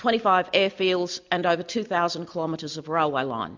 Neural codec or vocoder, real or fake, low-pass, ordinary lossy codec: none; real; 7.2 kHz; MP3, 64 kbps